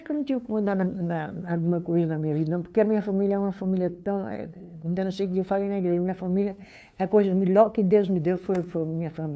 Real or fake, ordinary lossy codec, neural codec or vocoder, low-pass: fake; none; codec, 16 kHz, 2 kbps, FunCodec, trained on LibriTTS, 25 frames a second; none